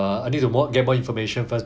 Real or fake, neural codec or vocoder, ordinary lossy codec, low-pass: real; none; none; none